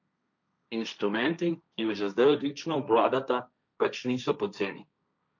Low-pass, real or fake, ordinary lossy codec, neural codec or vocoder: 7.2 kHz; fake; none; codec, 16 kHz, 1.1 kbps, Voila-Tokenizer